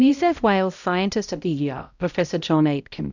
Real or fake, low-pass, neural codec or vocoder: fake; 7.2 kHz; codec, 16 kHz, 0.5 kbps, X-Codec, HuBERT features, trained on balanced general audio